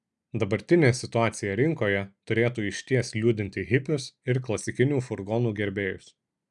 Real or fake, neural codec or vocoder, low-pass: real; none; 10.8 kHz